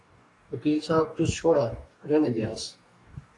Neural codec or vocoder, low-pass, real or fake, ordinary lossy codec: codec, 44.1 kHz, 2.6 kbps, DAC; 10.8 kHz; fake; AAC, 48 kbps